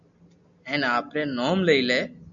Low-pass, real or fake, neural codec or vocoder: 7.2 kHz; real; none